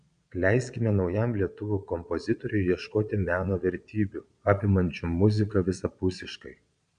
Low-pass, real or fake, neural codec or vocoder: 9.9 kHz; fake; vocoder, 22.05 kHz, 80 mel bands, Vocos